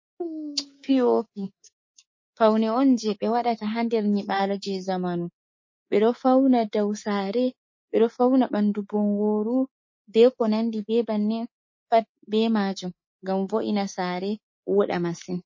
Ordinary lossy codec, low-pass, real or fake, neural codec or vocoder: MP3, 32 kbps; 7.2 kHz; fake; codec, 24 kHz, 3.1 kbps, DualCodec